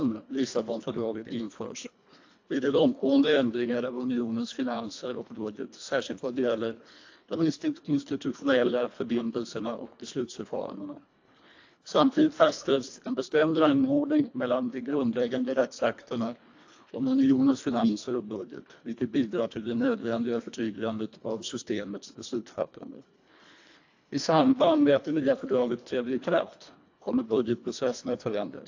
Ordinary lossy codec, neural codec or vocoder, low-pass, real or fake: AAC, 48 kbps; codec, 24 kHz, 1.5 kbps, HILCodec; 7.2 kHz; fake